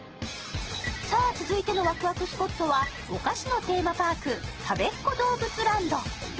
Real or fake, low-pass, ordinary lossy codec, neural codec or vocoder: real; 7.2 kHz; Opus, 16 kbps; none